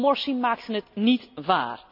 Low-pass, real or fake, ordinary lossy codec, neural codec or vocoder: 5.4 kHz; real; none; none